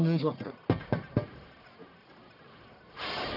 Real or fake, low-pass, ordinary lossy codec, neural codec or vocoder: fake; 5.4 kHz; none; codec, 44.1 kHz, 1.7 kbps, Pupu-Codec